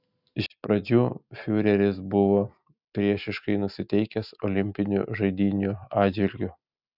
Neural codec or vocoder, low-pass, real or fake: none; 5.4 kHz; real